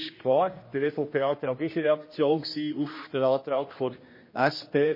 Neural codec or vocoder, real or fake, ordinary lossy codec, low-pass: codec, 16 kHz, 1 kbps, X-Codec, HuBERT features, trained on general audio; fake; MP3, 24 kbps; 5.4 kHz